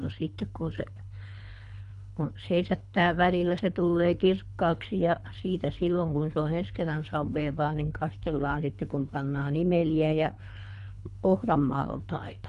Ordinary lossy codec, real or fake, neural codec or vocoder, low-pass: none; fake; codec, 24 kHz, 3 kbps, HILCodec; 10.8 kHz